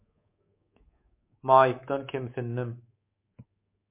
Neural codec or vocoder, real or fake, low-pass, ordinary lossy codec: codec, 24 kHz, 3.1 kbps, DualCodec; fake; 3.6 kHz; MP3, 24 kbps